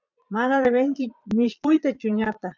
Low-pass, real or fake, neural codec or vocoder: 7.2 kHz; fake; vocoder, 22.05 kHz, 80 mel bands, Vocos